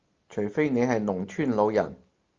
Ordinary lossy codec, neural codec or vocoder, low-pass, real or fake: Opus, 32 kbps; none; 7.2 kHz; real